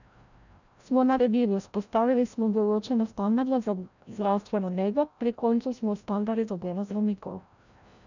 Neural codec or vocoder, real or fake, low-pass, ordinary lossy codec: codec, 16 kHz, 0.5 kbps, FreqCodec, larger model; fake; 7.2 kHz; none